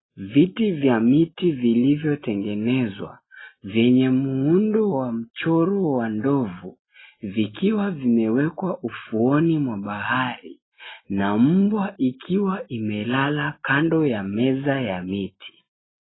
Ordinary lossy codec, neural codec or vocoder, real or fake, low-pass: AAC, 16 kbps; none; real; 7.2 kHz